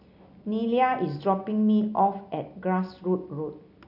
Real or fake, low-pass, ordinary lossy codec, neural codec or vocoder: real; 5.4 kHz; none; none